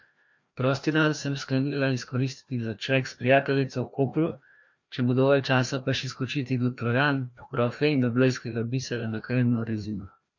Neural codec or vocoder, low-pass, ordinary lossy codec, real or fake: codec, 16 kHz, 1 kbps, FreqCodec, larger model; 7.2 kHz; MP3, 48 kbps; fake